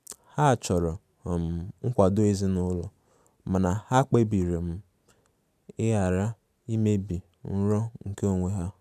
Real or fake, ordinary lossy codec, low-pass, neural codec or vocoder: real; none; 14.4 kHz; none